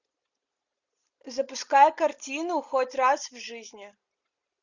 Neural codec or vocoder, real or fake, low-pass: none; real; 7.2 kHz